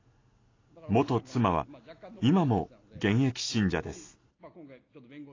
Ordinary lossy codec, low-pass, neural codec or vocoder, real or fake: AAC, 32 kbps; 7.2 kHz; none; real